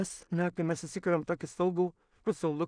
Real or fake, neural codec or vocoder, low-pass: fake; codec, 16 kHz in and 24 kHz out, 0.4 kbps, LongCat-Audio-Codec, two codebook decoder; 9.9 kHz